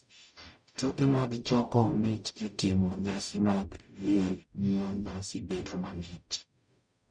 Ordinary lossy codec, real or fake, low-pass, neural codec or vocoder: none; fake; 9.9 kHz; codec, 44.1 kHz, 0.9 kbps, DAC